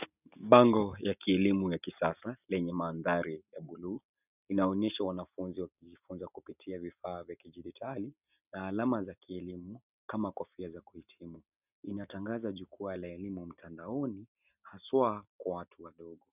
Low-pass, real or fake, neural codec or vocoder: 3.6 kHz; real; none